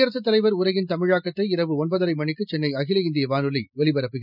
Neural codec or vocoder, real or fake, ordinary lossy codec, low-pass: none; real; none; 5.4 kHz